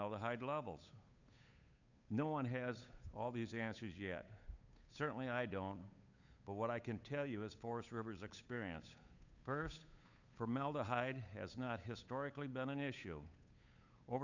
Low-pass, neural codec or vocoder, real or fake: 7.2 kHz; codec, 16 kHz, 8 kbps, FunCodec, trained on Chinese and English, 25 frames a second; fake